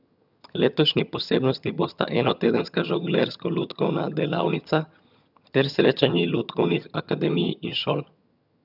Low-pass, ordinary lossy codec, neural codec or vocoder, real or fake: 5.4 kHz; none; vocoder, 22.05 kHz, 80 mel bands, HiFi-GAN; fake